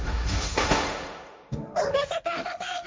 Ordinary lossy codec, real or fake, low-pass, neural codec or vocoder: none; fake; none; codec, 16 kHz, 1.1 kbps, Voila-Tokenizer